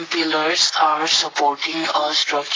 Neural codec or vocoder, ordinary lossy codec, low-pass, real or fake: codec, 44.1 kHz, 7.8 kbps, Pupu-Codec; AAC, 32 kbps; 7.2 kHz; fake